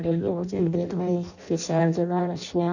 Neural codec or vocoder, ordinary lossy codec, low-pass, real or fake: codec, 16 kHz in and 24 kHz out, 0.6 kbps, FireRedTTS-2 codec; none; 7.2 kHz; fake